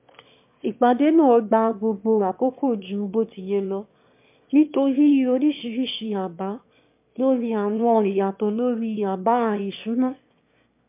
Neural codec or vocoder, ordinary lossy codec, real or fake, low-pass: autoencoder, 22.05 kHz, a latent of 192 numbers a frame, VITS, trained on one speaker; MP3, 32 kbps; fake; 3.6 kHz